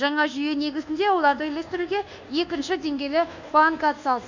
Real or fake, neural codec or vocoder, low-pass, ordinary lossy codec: fake; codec, 24 kHz, 1.2 kbps, DualCodec; 7.2 kHz; none